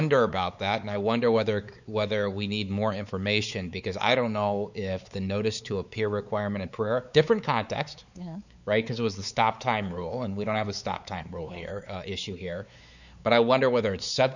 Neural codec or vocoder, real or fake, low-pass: codec, 16 kHz, 4 kbps, X-Codec, WavLM features, trained on Multilingual LibriSpeech; fake; 7.2 kHz